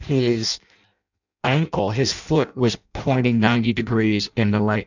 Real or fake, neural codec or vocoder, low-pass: fake; codec, 16 kHz in and 24 kHz out, 0.6 kbps, FireRedTTS-2 codec; 7.2 kHz